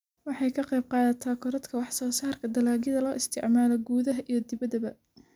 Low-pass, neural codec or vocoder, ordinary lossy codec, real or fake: 19.8 kHz; none; none; real